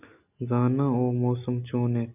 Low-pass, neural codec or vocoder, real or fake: 3.6 kHz; none; real